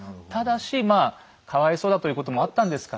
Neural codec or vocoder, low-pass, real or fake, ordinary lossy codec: none; none; real; none